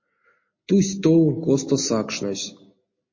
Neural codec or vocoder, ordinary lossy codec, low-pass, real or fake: none; MP3, 32 kbps; 7.2 kHz; real